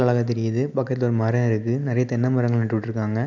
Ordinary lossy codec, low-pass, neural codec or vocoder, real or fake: none; 7.2 kHz; none; real